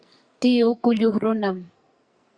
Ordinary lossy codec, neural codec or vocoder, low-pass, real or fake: Opus, 64 kbps; codec, 44.1 kHz, 2.6 kbps, SNAC; 9.9 kHz; fake